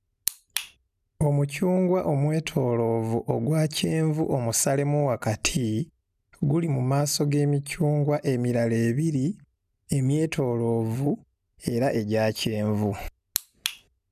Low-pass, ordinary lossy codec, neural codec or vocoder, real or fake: 14.4 kHz; none; none; real